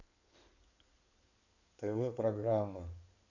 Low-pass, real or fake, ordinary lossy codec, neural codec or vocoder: 7.2 kHz; fake; none; codec, 16 kHz in and 24 kHz out, 2.2 kbps, FireRedTTS-2 codec